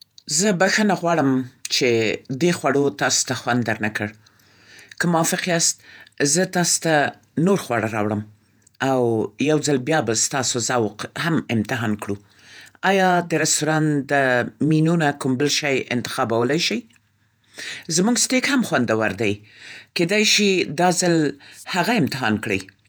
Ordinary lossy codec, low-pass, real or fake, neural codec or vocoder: none; none; fake; vocoder, 48 kHz, 128 mel bands, Vocos